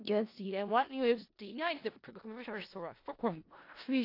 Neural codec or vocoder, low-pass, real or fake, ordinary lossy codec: codec, 16 kHz in and 24 kHz out, 0.4 kbps, LongCat-Audio-Codec, four codebook decoder; 5.4 kHz; fake; AAC, 32 kbps